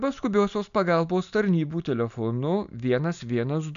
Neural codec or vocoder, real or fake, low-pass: none; real; 7.2 kHz